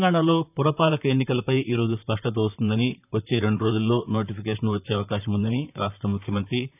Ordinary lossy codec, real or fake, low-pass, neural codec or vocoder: none; fake; 3.6 kHz; vocoder, 44.1 kHz, 128 mel bands, Pupu-Vocoder